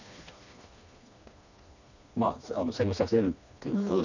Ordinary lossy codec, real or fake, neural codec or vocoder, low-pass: none; fake; codec, 16 kHz, 2 kbps, FreqCodec, smaller model; 7.2 kHz